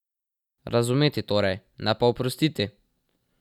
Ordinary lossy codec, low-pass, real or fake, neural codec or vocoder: none; 19.8 kHz; real; none